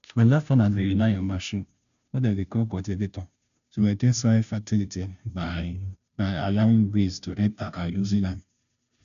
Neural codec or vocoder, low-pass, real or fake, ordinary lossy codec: codec, 16 kHz, 0.5 kbps, FunCodec, trained on Chinese and English, 25 frames a second; 7.2 kHz; fake; none